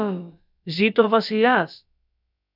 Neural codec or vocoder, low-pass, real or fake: codec, 16 kHz, about 1 kbps, DyCAST, with the encoder's durations; 5.4 kHz; fake